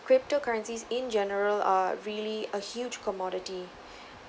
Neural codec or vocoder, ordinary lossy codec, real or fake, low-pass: none; none; real; none